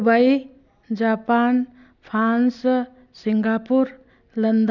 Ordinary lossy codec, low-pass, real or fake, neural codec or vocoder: none; 7.2 kHz; real; none